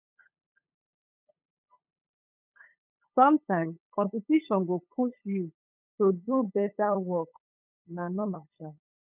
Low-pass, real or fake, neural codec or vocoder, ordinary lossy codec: 3.6 kHz; fake; codec, 16 kHz, 8 kbps, FunCodec, trained on LibriTTS, 25 frames a second; none